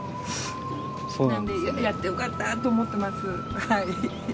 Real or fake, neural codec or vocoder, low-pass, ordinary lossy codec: real; none; none; none